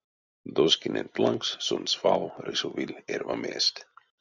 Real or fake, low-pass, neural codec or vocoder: real; 7.2 kHz; none